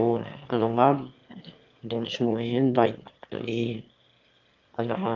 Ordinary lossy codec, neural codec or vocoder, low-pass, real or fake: Opus, 24 kbps; autoencoder, 22.05 kHz, a latent of 192 numbers a frame, VITS, trained on one speaker; 7.2 kHz; fake